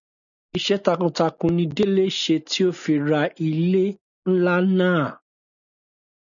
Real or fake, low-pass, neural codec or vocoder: real; 7.2 kHz; none